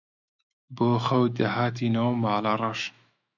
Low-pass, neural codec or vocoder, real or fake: 7.2 kHz; autoencoder, 48 kHz, 128 numbers a frame, DAC-VAE, trained on Japanese speech; fake